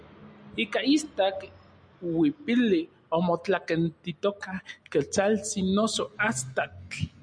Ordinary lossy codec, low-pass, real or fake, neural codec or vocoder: MP3, 96 kbps; 9.9 kHz; real; none